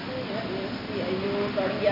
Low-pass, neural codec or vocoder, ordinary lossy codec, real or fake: 5.4 kHz; none; none; real